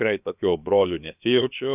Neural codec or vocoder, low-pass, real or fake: codec, 16 kHz, about 1 kbps, DyCAST, with the encoder's durations; 3.6 kHz; fake